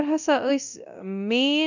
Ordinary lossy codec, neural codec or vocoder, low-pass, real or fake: none; codec, 24 kHz, 0.9 kbps, DualCodec; 7.2 kHz; fake